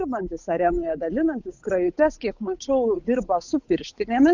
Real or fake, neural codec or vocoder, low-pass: fake; codec, 16 kHz, 8 kbps, FunCodec, trained on Chinese and English, 25 frames a second; 7.2 kHz